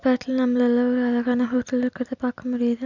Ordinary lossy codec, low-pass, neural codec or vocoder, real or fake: none; 7.2 kHz; none; real